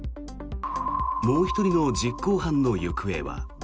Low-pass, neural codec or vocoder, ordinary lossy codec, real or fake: none; none; none; real